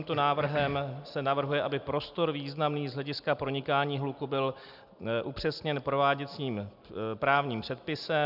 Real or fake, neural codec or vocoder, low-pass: real; none; 5.4 kHz